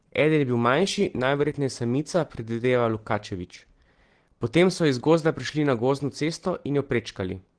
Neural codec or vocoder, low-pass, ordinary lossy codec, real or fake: none; 9.9 kHz; Opus, 16 kbps; real